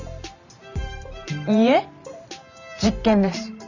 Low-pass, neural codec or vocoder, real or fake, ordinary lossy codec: 7.2 kHz; vocoder, 44.1 kHz, 128 mel bands every 512 samples, BigVGAN v2; fake; none